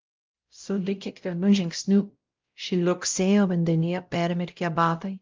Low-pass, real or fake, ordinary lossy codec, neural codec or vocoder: 7.2 kHz; fake; Opus, 24 kbps; codec, 16 kHz, 0.5 kbps, X-Codec, WavLM features, trained on Multilingual LibriSpeech